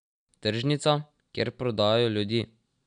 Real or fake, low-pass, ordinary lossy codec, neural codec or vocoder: real; 9.9 kHz; none; none